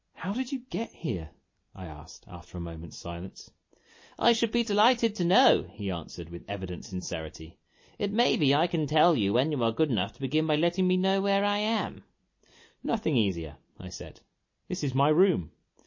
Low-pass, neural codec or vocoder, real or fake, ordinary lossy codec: 7.2 kHz; none; real; MP3, 32 kbps